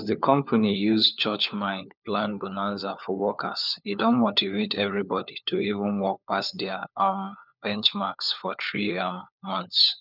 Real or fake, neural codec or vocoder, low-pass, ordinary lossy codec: fake; codec, 16 kHz, 4 kbps, FunCodec, trained on LibriTTS, 50 frames a second; 5.4 kHz; none